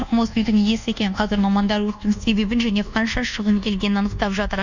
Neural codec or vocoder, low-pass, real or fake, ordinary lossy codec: codec, 24 kHz, 1.2 kbps, DualCodec; 7.2 kHz; fake; none